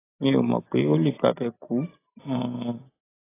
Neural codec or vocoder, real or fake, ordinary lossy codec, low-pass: none; real; AAC, 16 kbps; 3.6 kHz